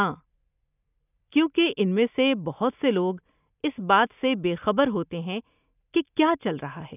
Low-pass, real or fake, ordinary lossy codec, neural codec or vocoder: 3.6 kHz; real; none; none